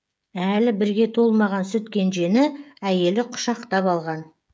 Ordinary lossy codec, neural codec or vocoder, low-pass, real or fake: none; codec, 16 kHz, 16 kbps, FreqCodec, smaller model; none; fake